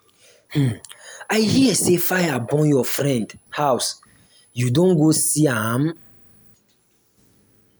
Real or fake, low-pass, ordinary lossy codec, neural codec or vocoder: real; none; none; none